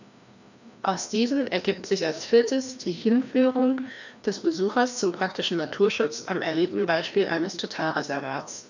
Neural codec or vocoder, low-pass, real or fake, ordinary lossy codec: codec, 16 kHz, 1 kbps, FreqCodec, larger model; 7.2 kHz; fake; none